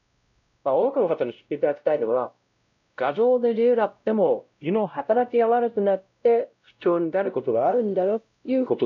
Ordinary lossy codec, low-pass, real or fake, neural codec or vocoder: none; 7.2 kHz; fake; codec, 16 kHz, 0.5 kbps, X-Codec, WavLM features, trained on Multilingual LibriSpeech